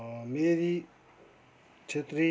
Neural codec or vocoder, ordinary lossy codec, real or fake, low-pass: none; none; real; none